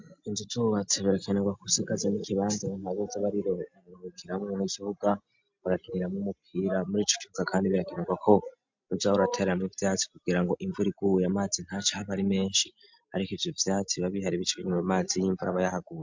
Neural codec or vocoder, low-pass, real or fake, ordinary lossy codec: none; 7.2 kHz; real; MP3, 64 kbps